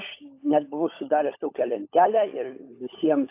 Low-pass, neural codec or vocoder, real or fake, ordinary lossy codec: 3.6 kHz; codec, 16 kHz, 16 kbps, FunCodec, trained on LibriTTS, 50 frames a second; fake; AAC, 24 kbps